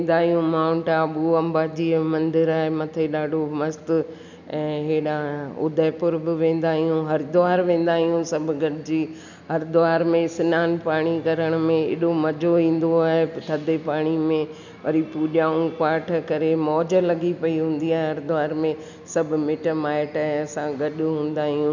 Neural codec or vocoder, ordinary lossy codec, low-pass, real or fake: none; none; 7.2 kHz; real